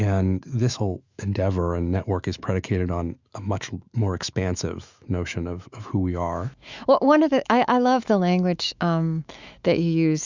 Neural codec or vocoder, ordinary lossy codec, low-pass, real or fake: autoencoder, 48 kHz, 128 numbers a frame, DAC-VAE, trained on Japanese speech; Opus, 64 kbps; 7.2 kHz; fake